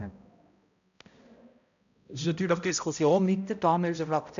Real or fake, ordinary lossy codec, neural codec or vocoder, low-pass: fake; AAC, 96 kbps; codec, 16 kHz, 0.5 kbps, X-Codec, HuBERT features, trained on balanced general audio; 7.2 kHz